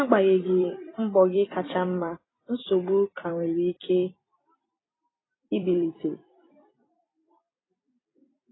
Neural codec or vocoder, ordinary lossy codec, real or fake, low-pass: vocoder, 44.1 kHz, 128 mel bands every 256 samples, BigVGAN v2; AAC, 16 kbps; fake; 7.2 kHz